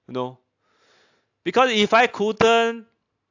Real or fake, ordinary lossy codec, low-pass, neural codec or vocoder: real; AAC, 48 kbps; 7.2 kHz; none